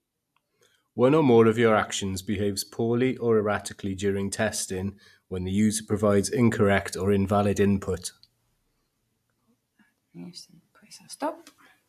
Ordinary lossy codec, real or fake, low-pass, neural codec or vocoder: AAC, 96 kbps; real; 14.4 kHz; none